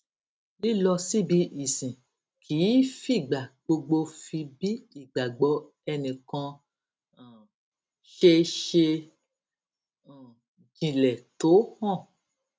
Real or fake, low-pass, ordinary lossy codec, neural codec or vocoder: real; none; none; none